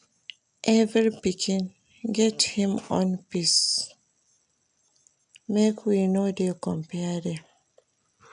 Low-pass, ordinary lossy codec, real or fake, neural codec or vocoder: 9.9 kHz; none; real; none